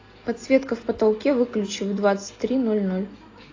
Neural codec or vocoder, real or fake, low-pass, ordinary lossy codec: none; real; 7.2 kHz; AAC, 32 kbps